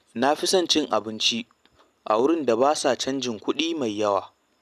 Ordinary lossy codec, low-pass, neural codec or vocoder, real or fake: none; 14.4 kHz; none; real